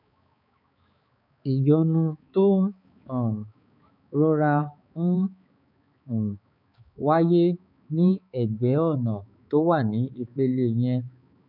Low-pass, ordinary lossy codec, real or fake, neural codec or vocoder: 5.4 kHz; none; fake; codec, 16 kHz, 4 kbps, X-Codec, HuBERT features, trained on balanced general audio